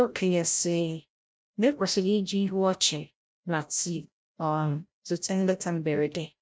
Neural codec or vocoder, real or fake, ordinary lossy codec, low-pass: codec, 16 kHz, 0.5 kbps, FreqCodec, larger model; fake; none; none